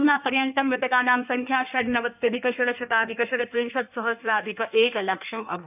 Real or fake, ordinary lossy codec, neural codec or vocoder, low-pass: fake; none; codec, 16 kHz in and 24 kHz out, 1.1 kbps, FireRedTTS-2 codec; 3.6 kHz